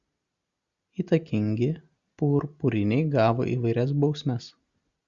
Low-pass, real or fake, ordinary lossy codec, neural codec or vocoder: 7.2 kHz; real; Opus, 64 kbps; none